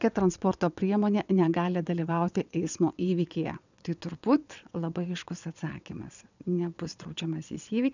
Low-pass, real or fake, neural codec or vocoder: 7.2 kHz; fake; vocoder, 22.05 kHz, 80 mel bands, WaveNeXt